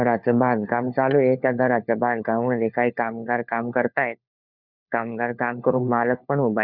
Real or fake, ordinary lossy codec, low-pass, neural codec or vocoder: fake; none; 5.4 kHz; codec, 16 kHz, 4 kbps, FunCodec, trained on LibriTTS, 50 frames a second